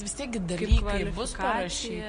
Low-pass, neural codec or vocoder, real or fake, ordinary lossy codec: 9.9 kHz; none; real; MP3, 48 kbps